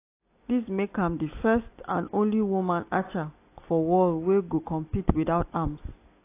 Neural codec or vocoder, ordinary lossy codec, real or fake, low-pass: none; AAC, 24 kbps; real; 3.6 kHz